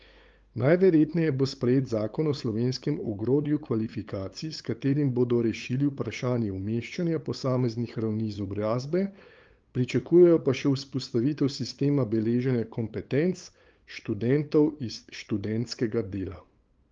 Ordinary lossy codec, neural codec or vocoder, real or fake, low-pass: Opus, 24 kbps; codec, 16 kHz, 8 kbps, FunCodec, trained on LibriTTS, 25 frames a second; fake; 7.2 kHz